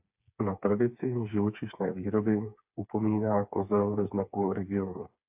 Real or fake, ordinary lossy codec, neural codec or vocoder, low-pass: fake; AAC, 32 kbps; codec, 16 kHz, 4 kbps, FreqCodec, smaller model; 3.6 kHz